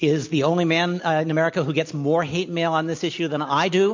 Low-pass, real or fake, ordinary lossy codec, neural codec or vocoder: 7.2 kHz; real; MP3, 48 kbps; none